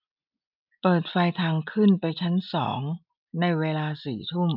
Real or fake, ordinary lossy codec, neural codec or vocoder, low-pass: real; none; none; 5.4 kHz